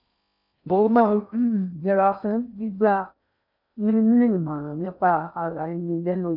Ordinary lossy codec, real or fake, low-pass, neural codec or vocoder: none; fake; 5.4 kHz; codec, 16 kHz in and 24 kHz out, 0.6 kbps, FocalCodec, streaming, 4096 codes